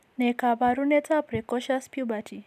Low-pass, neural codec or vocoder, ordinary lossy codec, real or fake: 14.4 kHz; none; none; real